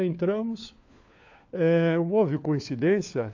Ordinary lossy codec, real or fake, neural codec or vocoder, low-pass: AAC, 48 kbps; fake; codec, 16 kHz, 4 kbps, FunCodec, trained on LibriTTS, 50 frames a second; 7.2 kHz